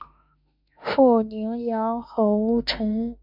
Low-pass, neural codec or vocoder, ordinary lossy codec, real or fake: 5.4 kHz; autoencoder, 48 kHz, 32 numbers a frame, DAC-VAE, trained on Japanese speech; MP3, 48 kbps; fake